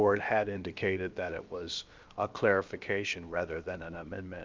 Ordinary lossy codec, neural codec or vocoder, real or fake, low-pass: Opus, 24 kbps; codec, 16 kHz, about 1 kbps, DyCAST, with the encoder's durations; fake; 7.2 kHz